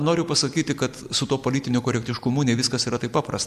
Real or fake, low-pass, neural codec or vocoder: real; 14.4 kHz; none